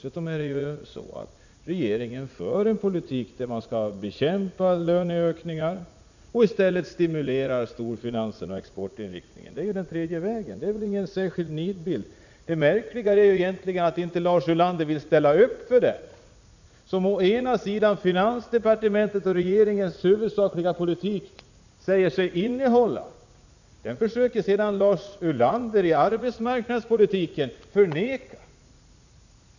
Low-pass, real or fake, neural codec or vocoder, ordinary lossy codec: 7.2 kHz; fake; vocoder, 44.1 kHz, 80 mel bands, Vocos; none